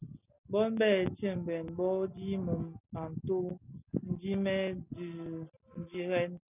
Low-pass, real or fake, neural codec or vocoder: 3.6 kHz; real; none